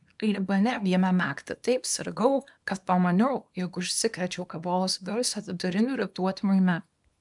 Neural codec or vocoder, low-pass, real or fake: codec, 24 kHz, 0.9 kbps, WavTokenizer, small release; 10.8 kHz; fake